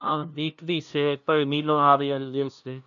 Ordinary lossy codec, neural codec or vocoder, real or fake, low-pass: MP3, 96 kbps; codec, 16 kHz, 0.5 kbps, FunCodec, trained on LibriTTS, 25 frames a second; fake; 7.2 kHz